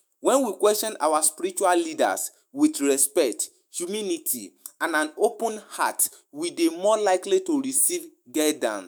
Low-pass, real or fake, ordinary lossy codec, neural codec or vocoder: none; fake; none; autoencoder, 48 kHz, 128 numbers a frame, DAC-VAE, trained on Japanese speech